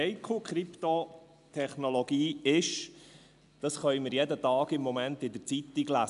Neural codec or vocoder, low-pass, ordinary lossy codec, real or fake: none; 10.8 kHz; none; real